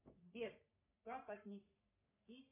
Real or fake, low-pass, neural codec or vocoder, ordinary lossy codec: fake; 3.6 kHz; codec, 44.1 kHz, 3.4 kbps, Pupu-Codec; MP3, 32 kbps